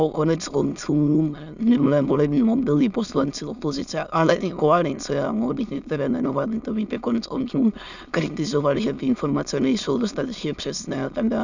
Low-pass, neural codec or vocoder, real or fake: 7.2 kHz; autoencoder, 22.05 kHz, a latent of 192 numbers a frame, VITS, trained on many speakers; fake